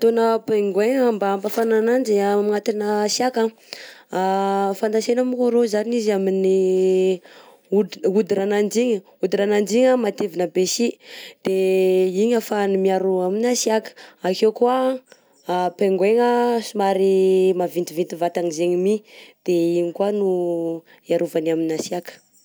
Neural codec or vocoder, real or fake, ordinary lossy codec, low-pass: none; real; none; none